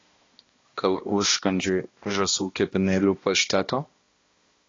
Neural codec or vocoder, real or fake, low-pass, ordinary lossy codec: codec, 16 kHz, 1 kbps, X-Codec, HuBERT features, trained on balanced general audio; fake; 7.2 kHz; AAC, 32 kbps